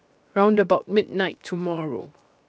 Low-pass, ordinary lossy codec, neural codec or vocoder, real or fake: none; none; codec, 16 kHz, 0.7 kbps, FocalCodec; fake